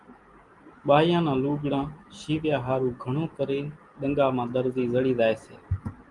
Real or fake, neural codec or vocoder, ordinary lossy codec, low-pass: real; none; Opus, 24 kbps; 10.8 kHz